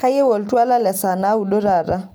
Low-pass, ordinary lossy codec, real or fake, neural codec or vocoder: none; none; real; none